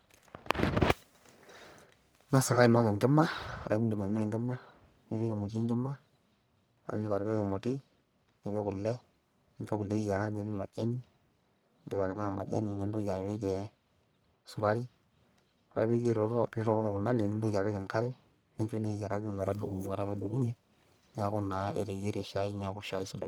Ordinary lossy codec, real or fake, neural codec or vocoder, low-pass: none; fake; codec, 44.1 kHz, 1.7 kbps, Pupu-Codec; none